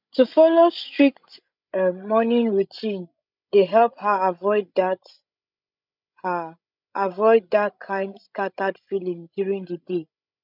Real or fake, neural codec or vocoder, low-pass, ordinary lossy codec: fake; codec, 16 kHz, 16 kbps, FreqCodec, larger model; 5.4 kHz; none